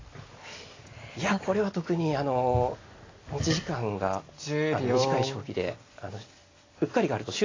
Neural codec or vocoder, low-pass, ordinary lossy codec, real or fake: none; 7.2 kHz; AAC, 32 kbps; real